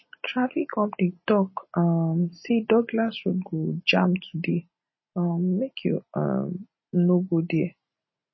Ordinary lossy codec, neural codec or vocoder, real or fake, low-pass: MP3, 24 kbps; none; real; 7.2 kHz